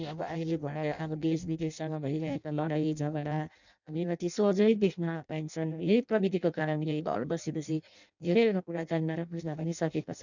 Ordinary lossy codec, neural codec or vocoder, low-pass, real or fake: none; codec, 16 kHz in and 24 kHz out, 0.6 kbps, FireRedTTS-2 codec; 7.2 kHz; fake